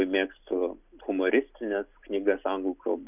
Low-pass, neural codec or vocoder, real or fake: 3.6 kHz; none; real